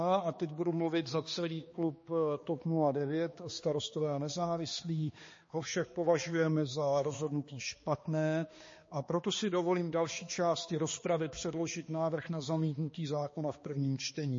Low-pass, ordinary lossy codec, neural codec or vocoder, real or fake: 7.2 kHz; MP3, 32 kbps; codec, 16 kHz, 2 kbps, X-Codec, HuBERT features, trained on balanced general audio; fake